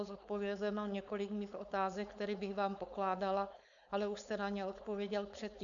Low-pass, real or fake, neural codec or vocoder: 7.2 kHz; fake; codec, 16 kHz, 4.8 kbps, FACodec